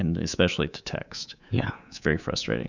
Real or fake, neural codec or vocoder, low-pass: fake; codec, 24 kHz, 3.1 kbps, DualCodec; 7.2 kHz